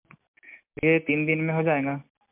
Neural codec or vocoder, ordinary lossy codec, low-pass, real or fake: none; MP3, 32 kbps; 3.6 kHz; real